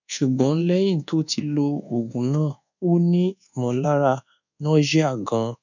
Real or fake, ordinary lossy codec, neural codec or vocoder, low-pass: fake; none; codec, 24 kHz, 1.2 kbps, DualCodec; 7.2 kHz